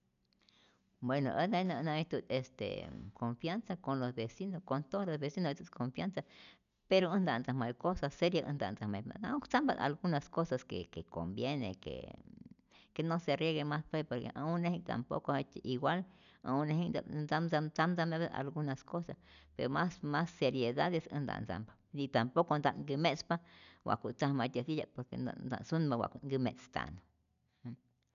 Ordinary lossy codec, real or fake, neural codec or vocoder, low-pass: none; real; none; 7.2 kHz